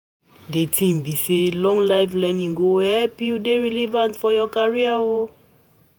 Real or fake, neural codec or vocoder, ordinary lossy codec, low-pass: fake; vocoder, 48 kHz, 128 mel bands, Vocos; none; none